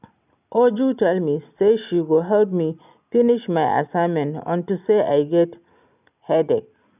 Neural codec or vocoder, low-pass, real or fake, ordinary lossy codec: none; 3.6 kHz; real; none